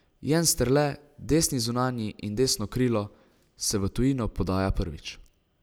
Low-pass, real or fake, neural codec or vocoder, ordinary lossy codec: none; real; none; none